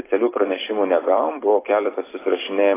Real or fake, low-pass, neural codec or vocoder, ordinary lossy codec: fake; 3.6 kHz; vocoder, 44.1 kHz, 128 mel bands every 256 samples, BigVGAN v2; AAC, 16 kbps